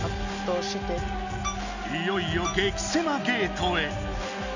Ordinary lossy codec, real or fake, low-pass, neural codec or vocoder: none; real; 7.2 kHz; none